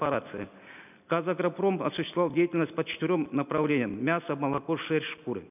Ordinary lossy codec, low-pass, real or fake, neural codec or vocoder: none; 3.6 kHz; real; none